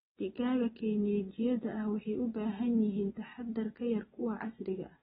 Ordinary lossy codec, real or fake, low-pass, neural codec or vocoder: AAC, 16 kbps; real; 19.8 kHz; none